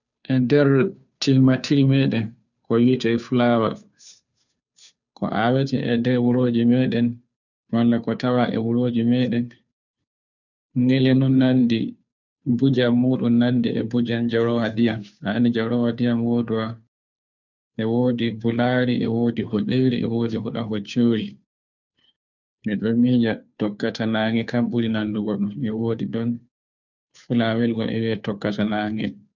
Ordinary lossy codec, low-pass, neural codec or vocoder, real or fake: none; 7.2 kHz; codec, 16 kHz, 2 kbps, FunCodec, trained on Chinese and English, 25 frames a second; fake